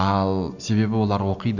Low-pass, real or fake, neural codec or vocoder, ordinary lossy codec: 7.2 kHz; real; none; none